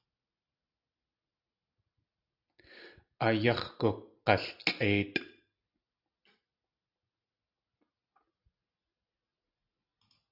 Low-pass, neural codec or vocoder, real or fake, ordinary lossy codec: 5.4 kHz; none; real; AAC, 48 kbps